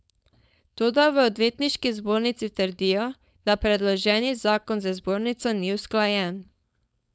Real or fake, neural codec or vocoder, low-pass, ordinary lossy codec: fake; codec, 16 kHz, 4.8 kbps, FACodec; none; none